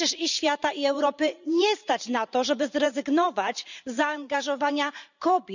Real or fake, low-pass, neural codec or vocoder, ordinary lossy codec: fake; 7.2 kHz; vocoder, 44.1 kHz, 128 mel bands every 512 samples, BigVGAN v2; none